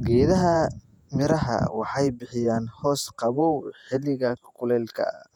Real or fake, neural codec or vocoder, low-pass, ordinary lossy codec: fake; vocoder, 48 kHz, 128 mel bands, Vocos; 19.8 kHz; none